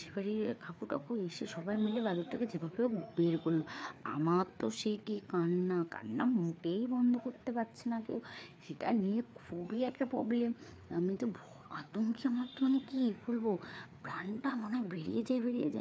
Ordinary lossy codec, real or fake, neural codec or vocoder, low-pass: none; fake; codec, 16 kHz, 4 kbps, FreqCodec, larger model; none